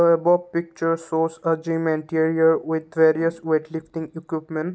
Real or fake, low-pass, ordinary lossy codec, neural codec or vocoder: real; none; none; none